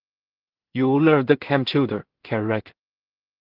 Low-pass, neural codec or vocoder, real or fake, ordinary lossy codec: 5.4 kHz; codec, 16 kHz in and 24 kHz out, 0.4 kbps, LongCat-Audio-Codec, two codebook decoder; fake; Opus, 16 kbps